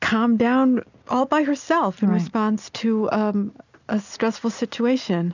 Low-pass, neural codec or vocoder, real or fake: 7.2 kHz; none; real